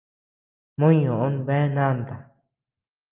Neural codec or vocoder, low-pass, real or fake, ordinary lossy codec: none; 3.6 kHz; real; Opus, 24 kbps